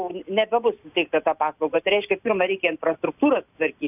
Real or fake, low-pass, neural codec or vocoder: real; 3.6 kHz; none